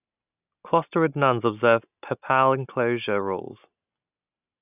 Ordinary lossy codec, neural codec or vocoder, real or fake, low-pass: none; none; real; 3.6 kHz